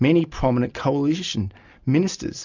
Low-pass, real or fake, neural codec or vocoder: 7.2 kHz; real; none